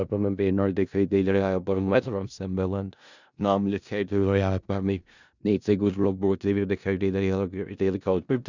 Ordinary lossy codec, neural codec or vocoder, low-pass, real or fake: none; codec, 16 kHz in and 24 kHz out, 0.4 kbps, LongCat-Audio-Codec, four codebook decoder; 7.2 kHz; fake